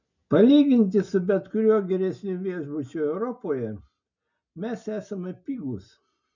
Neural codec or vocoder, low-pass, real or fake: none; 7.2 kHz; real